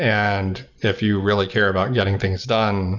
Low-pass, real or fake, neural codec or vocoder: 7.2 kHz; real; none